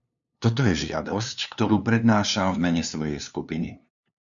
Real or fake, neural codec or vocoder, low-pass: fake; codec, 16 kHz, 2 kbps, FunCodec, trained on LibriTTS, 25 frames a second; 7.2 kHz